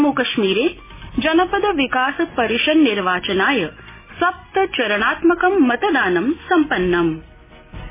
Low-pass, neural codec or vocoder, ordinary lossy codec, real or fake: 3.6 kHz; none; MP3, 16 kbps; real